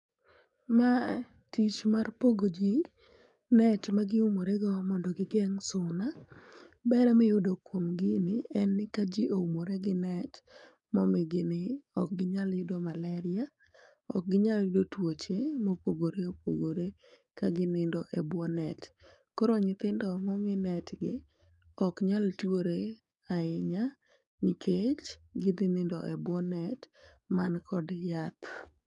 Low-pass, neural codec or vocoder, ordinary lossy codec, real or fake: 10.8 kHz; codec, 44.1 kHz, 7.8 kbps, DAC; none; fake